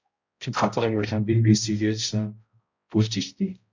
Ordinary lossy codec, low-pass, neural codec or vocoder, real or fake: MP3, 64 kbps; 7.2 kHz; codec, 16 kHz, 0.5 kbps, X-Codec, HuBERT features, trained on balanced general audio; fake